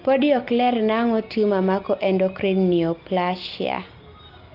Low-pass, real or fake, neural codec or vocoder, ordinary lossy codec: 5.4 kHz; real; none; Opus, 32 kbps